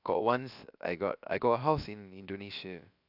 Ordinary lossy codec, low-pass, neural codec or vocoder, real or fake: none; 5.4 kHz; codec, 16 kHz, about 1 kbps, DyCAST, with the encoder's durations; fake